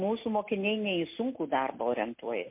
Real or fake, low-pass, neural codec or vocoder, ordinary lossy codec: real; 3.6 kHz; none; MP3, 24 kbps